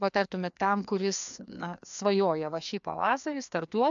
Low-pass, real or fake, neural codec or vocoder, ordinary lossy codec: 7.2 kHz; fake; codec, 16 kHz, 2 kbps, FreqCodec, larger model; MP3, 64 kbps